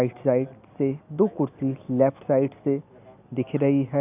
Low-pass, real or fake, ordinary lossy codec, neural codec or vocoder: 3.6 kHz; real; none; none